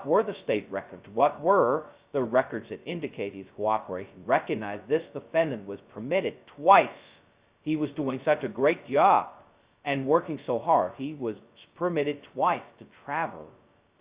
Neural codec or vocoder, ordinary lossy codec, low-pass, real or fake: codec, 16 kHz, 0.2 kbps, FocalCodec; Opus, 64 kbps; 3.6 kHz; fake